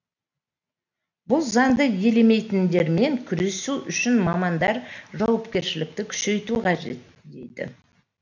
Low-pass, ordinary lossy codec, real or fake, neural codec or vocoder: 7.2 kHz; none; real; none